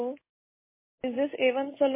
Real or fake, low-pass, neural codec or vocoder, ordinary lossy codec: real; 3.6 kHz; none; MP3, 16 kbps